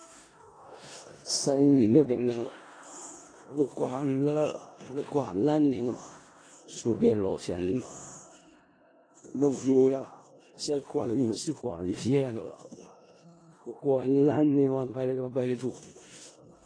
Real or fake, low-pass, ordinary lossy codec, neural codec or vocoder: fake; 9.9 kHz; AAC, 32 kbps; codec, 16 kHz in and 24 kHz out, 0.4 kbps, LongCat-Audio-Codec, four codebook decoder